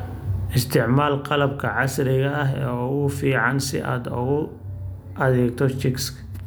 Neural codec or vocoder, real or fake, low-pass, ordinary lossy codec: none; real; none; none